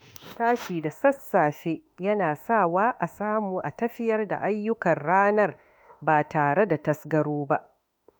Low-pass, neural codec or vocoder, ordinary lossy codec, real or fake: none; autoencoder, 48 kHz, 32 numbers a frame, DAC-VAE, trained on Japanese speech; none; fake